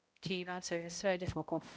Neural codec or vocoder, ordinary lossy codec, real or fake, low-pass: codec, 16 kHz, 0.5 kbps, X-Codec, HuBERT features, trained on balanced general audio; none; fake; none